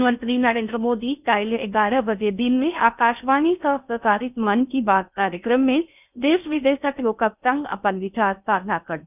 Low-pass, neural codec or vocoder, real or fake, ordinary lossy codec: 3.6 kHz; codec, 16 kHz in and 24 kHz out, 0.6 kbps, FocalCodec, streaming, 4096 codes; fake; none